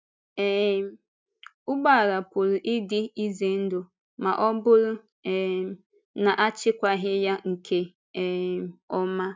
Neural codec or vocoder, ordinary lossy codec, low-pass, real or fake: none; none; none; real